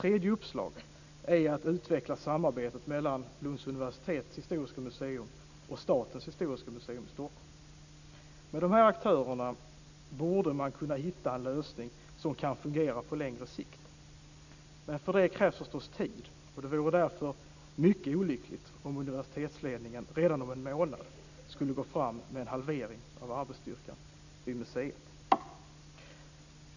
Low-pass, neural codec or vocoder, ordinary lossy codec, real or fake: 7.2 kHz; none; none; real